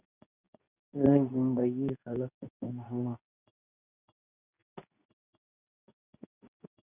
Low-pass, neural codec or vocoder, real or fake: 3.6 kHz; codec, 24 kHz, 0.9 kbps, WavTokenizer, medium speech release version 2; fake